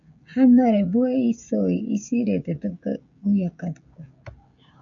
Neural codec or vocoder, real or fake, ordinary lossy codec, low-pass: codec, 16 kHz, 16 kbps, FreqCodec, smaller model; fake; AAC, 64 kbps; 7.2 kHz